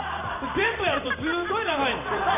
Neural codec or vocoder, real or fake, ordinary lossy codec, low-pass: vocoder, 44.1 kHz, 128 mel bands every 512 samples, BigVGAN v2; fake; none; 3.6 kHz